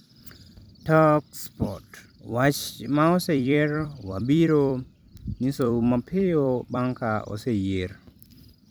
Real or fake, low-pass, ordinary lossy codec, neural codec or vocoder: fake; none; none; vocoder, 44.1 kHz, 128 mel bands every 256 samples, BigVGAN v2